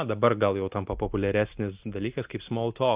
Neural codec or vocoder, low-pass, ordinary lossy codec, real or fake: none; 3.6 kHz; Opus, 24 kbps; real